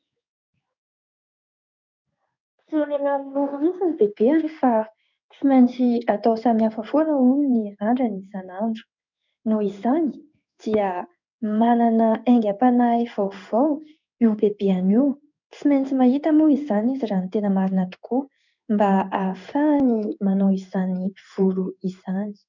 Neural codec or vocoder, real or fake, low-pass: codec, 16 kHz in and 24 kHz out, 1 kbps, XY-Tokenizer; fake; 7.2 kHz